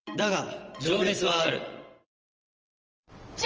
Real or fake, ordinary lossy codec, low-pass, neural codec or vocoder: fake; Opus, 24 kbps; 7.2 kHz; vocoder, 22.05 kHz, 80 mel bands, WaveNeXt